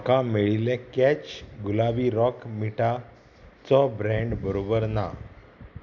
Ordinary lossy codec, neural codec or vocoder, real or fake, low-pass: none; none; real; 7.2 kHz